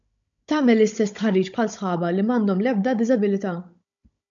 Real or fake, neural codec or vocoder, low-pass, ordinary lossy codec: fake; codec, 16 kHz, 16 kbps, FunCodec, trained on Chinese and English, 50 frames a second; 7.2 kHz; AAC, 64 kbps